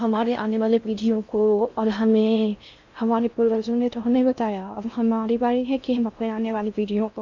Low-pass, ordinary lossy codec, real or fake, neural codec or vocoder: 7.2 kHz; MP3, 64 kbps; fake; codec, 16 kHz in and 24 kHz out, 0.6 kbps, FocalCodec, streaming, 4096 codes